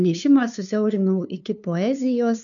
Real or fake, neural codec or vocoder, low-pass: fake; codec, 16 kHz, 2 kbps, FunCodec, trained on LibriTTS, 25 frames a second; 7.2 kHz